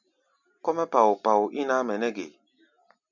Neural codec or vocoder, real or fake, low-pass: none; real; 7.2 kHz